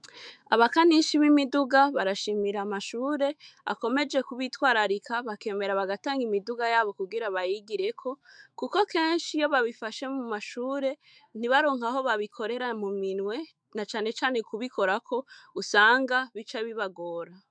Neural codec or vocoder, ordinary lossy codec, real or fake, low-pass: autoencoder, 48 kHz, 128 numbers a frame, DAC-VAE, trained on Japanese speech; MP3, 96 kbps; fake; 9.9 kHz